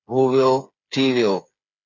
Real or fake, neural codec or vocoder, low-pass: fake; codec, 16 kHz, 8 kbps, FreqCodec, smaller model; 7.2 kHz